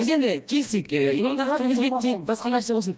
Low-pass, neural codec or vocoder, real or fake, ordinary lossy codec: none; codec, 16 kHz, 1 kbps, FreqCodec, smaller model; fake; none